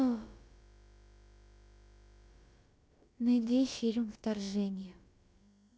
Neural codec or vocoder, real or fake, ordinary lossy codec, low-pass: codec, 16 kHz, about 1 kbps, DyCAST, with the encoder's durations; fake; none; none